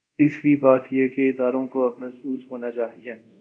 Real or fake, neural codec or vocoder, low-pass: fake; codec, 24 kHz, 0.5 kbps, DualCodec; 9.9 kHz